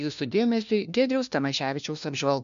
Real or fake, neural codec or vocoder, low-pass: fake; codec, 16 kHz, 1 kbps, FunCodec, trained on LibriTTS, 50 frames a second; 7.2 kHz